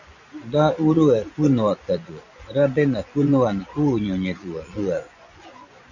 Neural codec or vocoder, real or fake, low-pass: vocoder, 24 kHz, 100 mel bands, Vocos; fake; 7.2 kHz